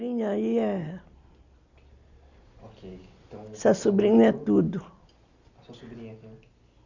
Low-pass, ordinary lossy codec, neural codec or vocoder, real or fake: 7.2 kHz; Opus, 64 kbps; none; real